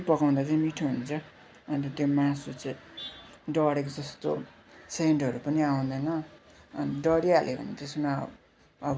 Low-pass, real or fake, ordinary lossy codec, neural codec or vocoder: none; real; none; none